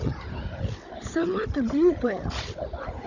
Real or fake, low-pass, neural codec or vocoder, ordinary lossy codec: fake; 7.2 kHz; codec, 16 kHz, 16 kbps, FunCodec, trained on Chinese and English, 50 frames a second; none